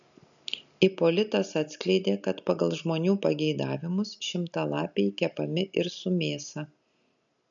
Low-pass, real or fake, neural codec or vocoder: 7.2 kHz; real; none